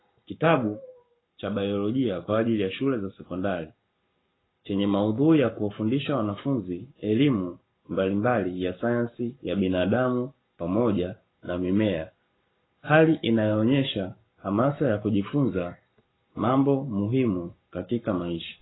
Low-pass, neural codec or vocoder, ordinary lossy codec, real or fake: 7.2 kHz; codec, 44.1 kHz, 7.8 kbps, Pupu-Codec; AAC, 16 kbps; fake